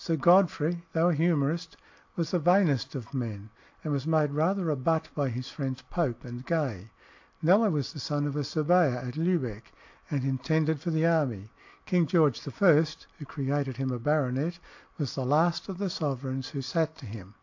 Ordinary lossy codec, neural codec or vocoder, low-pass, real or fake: AAC, 48 kbps; none; 7.2 kHz; real